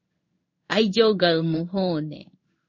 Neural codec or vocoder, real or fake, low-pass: codec, 16 kHz in and 24 kHz out, 1 kbps, XY-Tokenizer; fake; 7.2 kHz